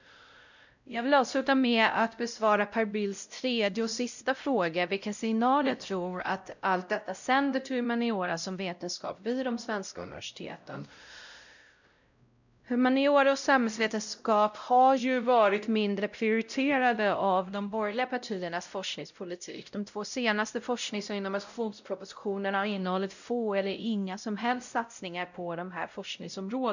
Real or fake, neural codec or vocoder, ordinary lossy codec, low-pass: fake; codec, 16 kHz, 0.5 kbps, X-Codec, WavLM features, trained on Multilingual LibriSpeech; none; 7.2 kHz